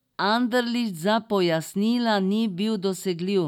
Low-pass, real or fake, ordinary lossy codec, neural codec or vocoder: 19.8 kHz; real; none; none